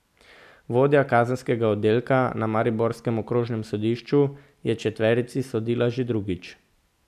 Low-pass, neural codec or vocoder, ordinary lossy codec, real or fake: 14.4 kHz; none; none; real